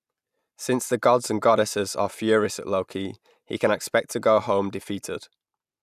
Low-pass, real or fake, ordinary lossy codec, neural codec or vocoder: 14.4 kHz; real; none; none